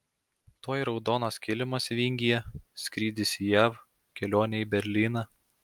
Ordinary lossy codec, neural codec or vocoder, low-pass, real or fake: Opus, 32 kbps; none; 19.8 kHz; real